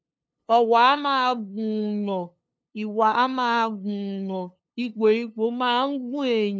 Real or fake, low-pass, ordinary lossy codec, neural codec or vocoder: fake; none; none; codec, 16 kHz, 2 kbps, FunCodec, trained on LibriTTS, 25 frames a second